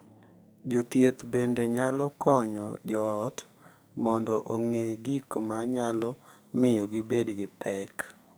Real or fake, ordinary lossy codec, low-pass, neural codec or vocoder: fake; none; none; codec, 44.1 kHz, 2.6 kbps, SNAC